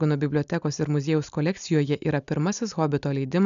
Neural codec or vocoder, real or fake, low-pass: none; real; 7.2 kHz